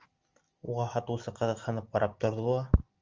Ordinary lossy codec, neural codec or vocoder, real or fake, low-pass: Opus, 32 kbps; none; real; 7.2 kHz